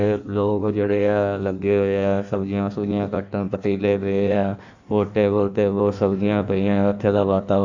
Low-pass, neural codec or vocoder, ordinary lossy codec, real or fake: 7.2 kHz; codec, 16 kHz in and 24 kHz out, 1.1 kbps, FireRedTTS-2 codec; none; fake